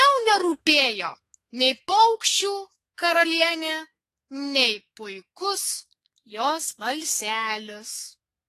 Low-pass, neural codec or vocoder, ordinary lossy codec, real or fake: 14.4 kHz; codec, 32 kHz, 1.9 kbps, SNAC; AAC, 48 kbps; fake